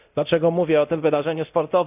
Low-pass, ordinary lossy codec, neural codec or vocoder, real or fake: 3.6 kHz; none; codec, 24 kHz, 0.9 kbps, DualCodec; fake